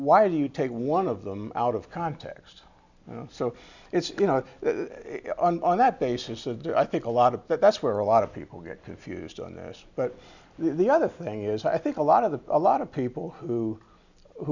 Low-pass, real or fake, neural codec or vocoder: 7.2 kHz; real; none